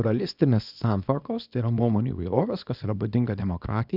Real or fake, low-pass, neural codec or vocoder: fake; 5.4 kHz; codec, 24 kHz, 0.9 kbps, WavTokenizer, small release